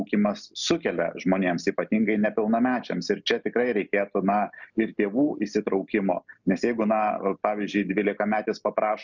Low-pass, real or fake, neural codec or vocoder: 7.2 kHz; real; none